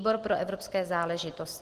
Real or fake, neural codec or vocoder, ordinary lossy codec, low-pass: real; none; Opus, 16 kbps; 14.4 kHz